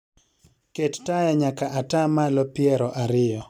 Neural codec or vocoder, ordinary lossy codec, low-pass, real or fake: none; none; 19.8 kHz; real